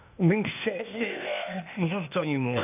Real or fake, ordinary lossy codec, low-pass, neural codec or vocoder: fake; none; 3.6 kHz; codec, 16 kHz, 0.8 kbps, ZipCodec